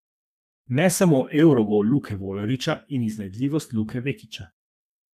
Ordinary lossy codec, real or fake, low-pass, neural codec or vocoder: none; fake; 14.4 kHz; codec, 32 kHz, 1.9 kbps, SNAC